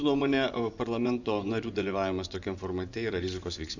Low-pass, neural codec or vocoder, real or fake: 7.2 kHz; vocoder, 24 kHz, 100 mel bands, Vocos; fake